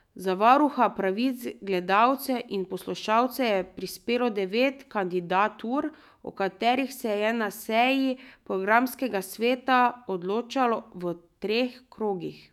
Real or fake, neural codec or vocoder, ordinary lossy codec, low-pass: fake; autoencoder, 48 kHz, 128 numbers a frame, DAC-VAE, trained on Japanese speech; none; 19.8 kHz